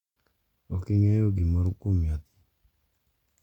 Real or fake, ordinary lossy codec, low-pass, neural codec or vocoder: real; Opus, 64 kbps; 19.8 kHz; none